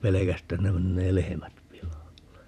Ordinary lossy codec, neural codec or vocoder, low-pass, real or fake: none; vocoder, 44.1 kHz, 128 mel bands every 512 samples, BigVGAN v2; 14.4 kHz; fake